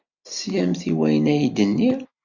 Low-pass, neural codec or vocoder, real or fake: 7.2 kHz; none; real